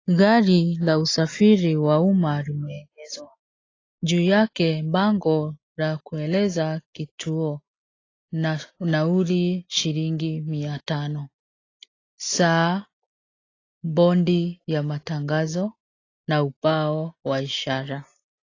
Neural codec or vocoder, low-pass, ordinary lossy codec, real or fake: none; 7.2 kHz; AAC, 32 kbps; real